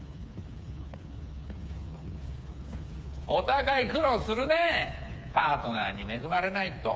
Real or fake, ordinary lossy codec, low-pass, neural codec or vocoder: fake; none; none; codec, 16 kHz, 4 kbps, FreqCodec, smaller model